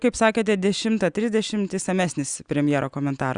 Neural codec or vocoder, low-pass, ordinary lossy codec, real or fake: none; 9.9 kHz; Opus, 64 kbps; real